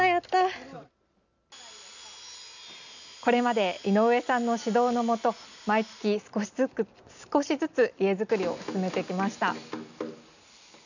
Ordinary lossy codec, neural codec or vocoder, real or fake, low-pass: none; none; real; 7.2 kHz